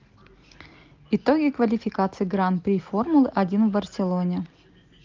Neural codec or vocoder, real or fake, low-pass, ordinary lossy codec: none; real; 7.2 kHz; Opus, 32 kbps